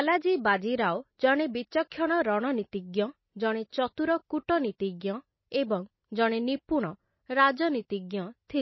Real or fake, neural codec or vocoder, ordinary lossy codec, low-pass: real; none; MP3, 24 kbps; 7.2 kHz